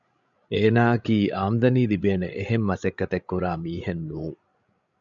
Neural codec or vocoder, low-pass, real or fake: codec, 16 kHz, 16 kbps, FreqCodec, larger model; 7.2 kHz; fake